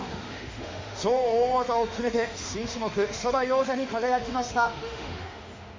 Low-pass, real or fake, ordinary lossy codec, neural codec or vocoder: 7.2 kHz; fake; MP3, 48 kbps; autoencoder, 48 kHz, 32 numbers a frame, DAC-VAE, trained on Japanese speech